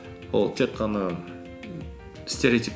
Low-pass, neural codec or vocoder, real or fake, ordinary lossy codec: none; none; real; none